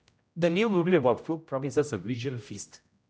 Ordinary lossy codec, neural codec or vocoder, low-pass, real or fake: none; codec, 16 kHz, 0.5 kbps, X-Codec, HuBERT features, trained on general audio; none; fake